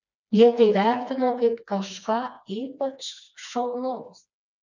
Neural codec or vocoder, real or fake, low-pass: codec, 16 kHz, 2 kbps, FreqCodec, smaller model; fake; 7.2 kHz